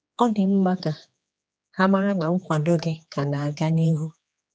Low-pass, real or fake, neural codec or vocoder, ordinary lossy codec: none; fake; codec, 16 kHz, 2 kbps, X-Codec, HuBERT features, trained on general audio; none